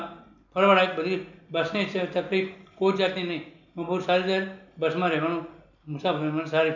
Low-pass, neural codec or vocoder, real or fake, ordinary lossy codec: 7.2 kHz; none; real; none